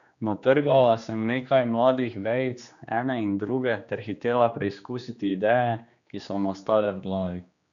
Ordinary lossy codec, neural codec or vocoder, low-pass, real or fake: none; codec, 16 kHz, 2 kbps, X-Codec, HuBERT features, trained on general audio; 7.2 kHz; fake